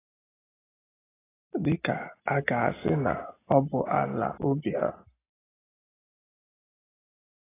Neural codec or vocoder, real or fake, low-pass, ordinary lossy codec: none; real; 3.6 kHz; AAC, 16 kbps